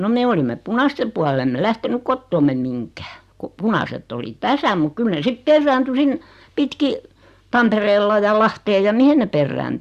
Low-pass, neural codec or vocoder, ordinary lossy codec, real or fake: 14.4 kHz; none; Opus, 64 kbps; real